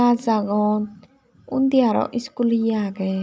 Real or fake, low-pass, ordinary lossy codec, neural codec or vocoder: real; none; none; none